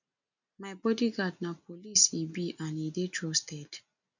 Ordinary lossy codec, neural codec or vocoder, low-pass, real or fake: none; none; 7.2 kHz; real